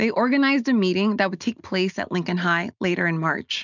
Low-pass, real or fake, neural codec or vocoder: 7.2 kHz; real; none